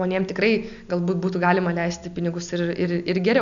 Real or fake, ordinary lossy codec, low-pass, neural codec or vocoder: real; MP3, 96 kbps; 7.2 kHz; none